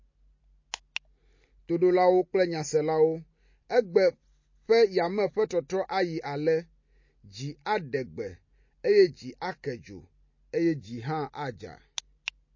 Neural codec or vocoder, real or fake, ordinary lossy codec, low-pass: none; real; MP3, 32 kbps; 7.2 kHz